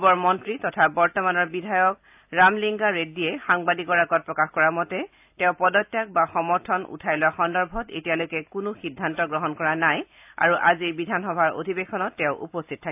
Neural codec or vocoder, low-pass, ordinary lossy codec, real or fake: none; 3.6 kHz; AAC, 32 kbps; real